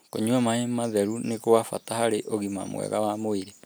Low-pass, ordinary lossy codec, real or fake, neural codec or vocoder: none; none; real; none